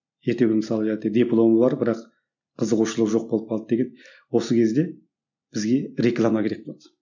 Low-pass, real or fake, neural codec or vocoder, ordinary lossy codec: 7.2 kHz; real; none; none